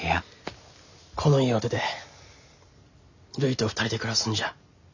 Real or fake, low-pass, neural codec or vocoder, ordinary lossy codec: real; 7.2 kHz; none; none